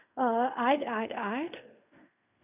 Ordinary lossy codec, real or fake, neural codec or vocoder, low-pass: none; fake; codec, 16 kHz in and 24 kHz out, 0.4 kbps, LongCat-Audio-Codec, fine tuned four codebook decoder; 3.6 kHz